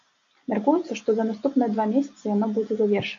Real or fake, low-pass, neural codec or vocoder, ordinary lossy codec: real; 7.2 kHz; none; AAC, 48 kbps